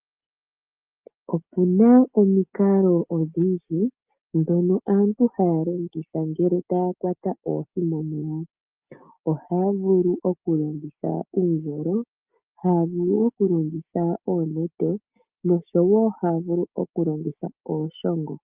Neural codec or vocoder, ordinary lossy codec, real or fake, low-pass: none; Opus, 24 kbps; real; 3.6 kHz